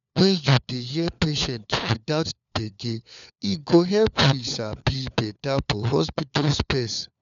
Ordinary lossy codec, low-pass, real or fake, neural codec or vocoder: none; 7.2 kHz; fake; codec, 16 kHz, 4 kbps, FunCodec, trained on LibriTTS, 50 frames a second